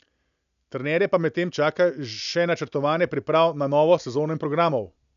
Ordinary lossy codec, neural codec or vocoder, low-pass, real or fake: none; none; 7.2 kHz; real